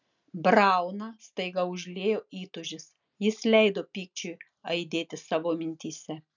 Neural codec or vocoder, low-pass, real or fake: none; 7.2 kHz; real